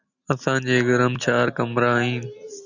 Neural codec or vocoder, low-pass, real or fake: none; 7.2 kHz; real